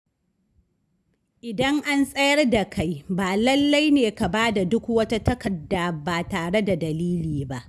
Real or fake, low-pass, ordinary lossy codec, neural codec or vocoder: real; none; none; none